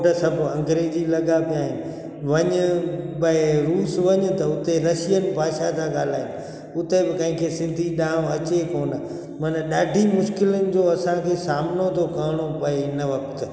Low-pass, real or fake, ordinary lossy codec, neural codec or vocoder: none; real; none; none